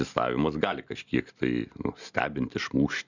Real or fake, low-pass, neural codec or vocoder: real; 7.2 kHz; none